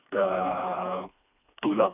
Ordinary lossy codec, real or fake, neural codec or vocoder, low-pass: none; fake; codec, 16 kHz, 2 kbps, FreqCodec, smaller model; 3.6 kHz